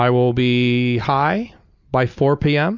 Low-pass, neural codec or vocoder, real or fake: 7.2 kHz; none; real